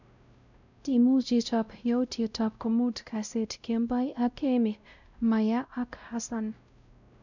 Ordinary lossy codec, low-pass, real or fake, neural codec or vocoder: none; 7.2 kHz; fake; codec, 16 kHz, 0.5 kbps, X-Codec, WavLM features, trained on Multilingual LibriSpeech